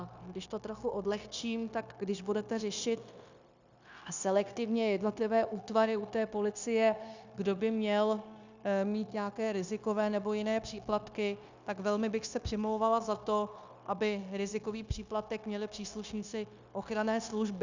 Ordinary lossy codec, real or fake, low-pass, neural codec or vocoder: Opus, 64 kbps; fake; 7.2 kHz; codec, 16 kHz, 0.9 kbps, LongCat-Audio-Codec